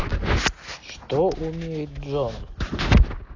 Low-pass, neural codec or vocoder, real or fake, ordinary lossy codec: 7.2 kHz; none; real; AAC, 32 kbps